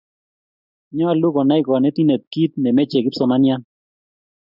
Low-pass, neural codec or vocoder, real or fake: 5.4 kHz; none; real